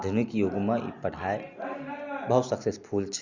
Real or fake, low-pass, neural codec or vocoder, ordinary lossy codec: real; 7.2 kHz; none; Opus, 64 kbps